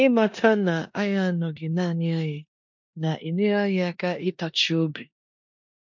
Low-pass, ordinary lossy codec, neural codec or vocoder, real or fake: 7.2 kHz; MP3, 48 kbps; codec, 16 kHz in and 24 kHz out, 0.9 kbps, LongCat-Audio-Codec, four codebook decoder; fake